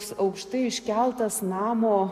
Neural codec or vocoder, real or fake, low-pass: none; real; 14.4 kHz